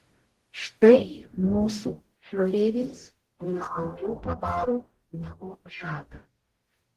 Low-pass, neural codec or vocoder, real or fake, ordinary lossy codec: 14.4 kHz; codec, 44.1 kHz, 0.9 kbps, DAC; fake; Opus, 16 kbps